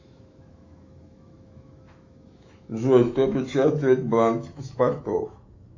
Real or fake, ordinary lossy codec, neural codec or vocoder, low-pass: fake; AAC, 48 kbps; autoencoder, 48 kHz, 128 numbers a frame, DAC-VAE, trained on Japanese speech; 7.2 kHz